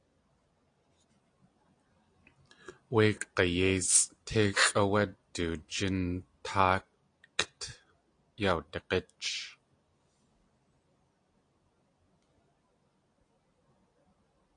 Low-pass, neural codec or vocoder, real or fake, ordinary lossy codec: 9.9 kHz; none; real; AAC, 48 kbps